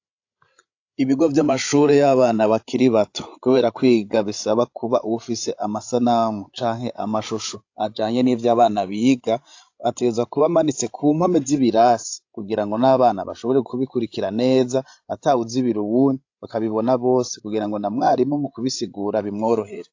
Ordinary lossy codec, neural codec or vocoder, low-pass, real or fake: AAC, 48 kbps; codec, 16 kHz, 16 kbps, FreqCodec, larger model; 7.2 kHz; fake